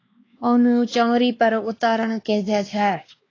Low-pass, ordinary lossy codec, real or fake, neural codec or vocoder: 7.2 kHz; AAC, 32 kbps; fake; codec, 16 kHz, 2 kbps, X-Codec, WavLM features, trained on Multilingual LibriSpeech